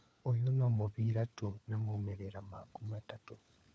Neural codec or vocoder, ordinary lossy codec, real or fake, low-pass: codec, 16 kHz, 4 kbps, FunCodec, trained on LibriTTS, 50 frames a second; none; fake; none